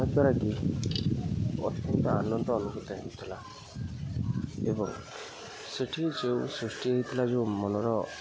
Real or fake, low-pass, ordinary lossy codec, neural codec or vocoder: real; none; none; none